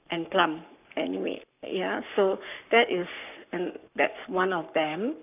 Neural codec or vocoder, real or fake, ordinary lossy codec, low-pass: codec, 44.1 kHz, 7.8 kbps, Pupu-Codec; fake; none; 3.6 kHz